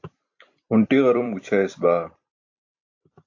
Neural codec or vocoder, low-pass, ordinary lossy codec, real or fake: vocoder, 44.1 kHz, 128 mel bands every 256 samples, BigVGAN v2; 7.2 kHz; AAC, 48 kbps; fake